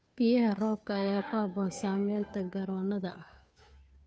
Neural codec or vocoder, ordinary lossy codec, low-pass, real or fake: codec, 16 kHz, 2 kbps, FunCodec, trained on Chinese and English, 25 frames a second; none; none; fake